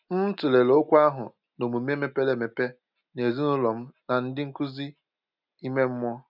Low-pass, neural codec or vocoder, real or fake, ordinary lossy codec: 5.4 kHz; none; real; none